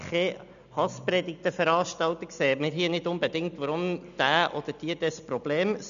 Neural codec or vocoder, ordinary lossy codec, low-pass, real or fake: none; none; 7.2 kHz; real